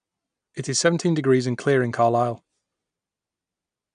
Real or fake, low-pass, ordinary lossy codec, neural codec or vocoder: real; 9.9 kHz; none; none